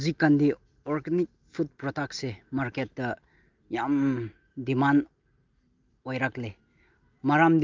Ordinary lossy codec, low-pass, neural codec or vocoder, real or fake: Opus, 24 kbps; 7.2 kHz; none; real